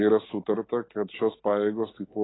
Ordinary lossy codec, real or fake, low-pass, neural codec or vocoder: AAC, 16 kbps; real; 7.2 kHz; none